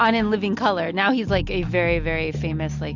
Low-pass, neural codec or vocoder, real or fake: 7.2 kHz; none; real